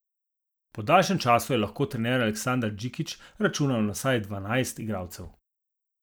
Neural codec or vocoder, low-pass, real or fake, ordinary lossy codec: none; none; real; none